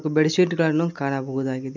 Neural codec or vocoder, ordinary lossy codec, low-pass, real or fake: none; none; 7.2 kHz; real